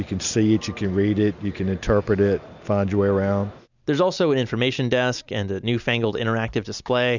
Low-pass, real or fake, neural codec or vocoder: 7.2 kHz; real; none